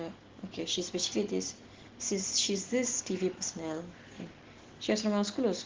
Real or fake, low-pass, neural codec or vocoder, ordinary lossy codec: real; 7.2 kHz; none; Opus, 16 kbps